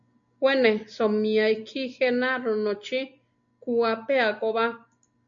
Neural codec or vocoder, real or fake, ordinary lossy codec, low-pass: none; real; MP3, 64 kbps; 7.2 kHz